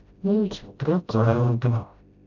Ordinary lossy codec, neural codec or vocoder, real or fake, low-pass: none; codec, 16 kHz, 0.5 kbps, FreqCodec, smaller model; fake; 7.2 kHz